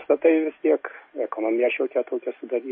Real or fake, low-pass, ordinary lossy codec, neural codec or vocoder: real; 7.2 kHz; MP3, 24 kbps; none